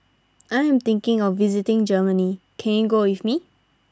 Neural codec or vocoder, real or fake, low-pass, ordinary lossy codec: none; real; none; none